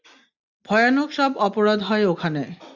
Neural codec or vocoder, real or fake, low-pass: none; real; 7.2 kHz